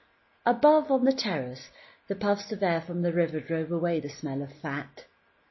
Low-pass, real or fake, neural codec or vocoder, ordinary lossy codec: 7.2 kHz; real; none; MP3, 24 kbps